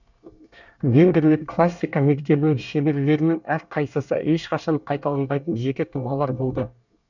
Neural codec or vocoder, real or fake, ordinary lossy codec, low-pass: codec, 24 kHz, 1 kbps, SNAC; fake; none; 7.2 kHz